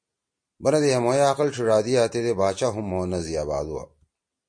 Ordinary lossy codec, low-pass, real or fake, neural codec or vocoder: MP3, 48 kbps; 9.9 kHz; real; none